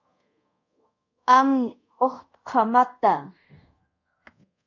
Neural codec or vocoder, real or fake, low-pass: codec, 24 kHz, 0.5 kbps, DualCodec; fake; 7.2 kHz